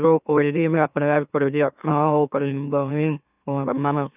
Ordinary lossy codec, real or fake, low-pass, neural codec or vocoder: none; fake; 3.6 kHz; autoencoder, 44.1 kHz, a latent of 192 numbers a frame, MeloTTS